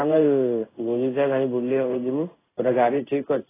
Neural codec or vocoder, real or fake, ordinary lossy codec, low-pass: codec, 16 kHz in and 24 kHz out, 1 kbps, XY-Tokenizer; fake; AAC, 16 kbps; 3.6 kHz